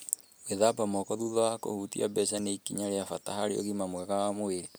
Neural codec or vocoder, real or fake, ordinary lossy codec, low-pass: none; real; none; none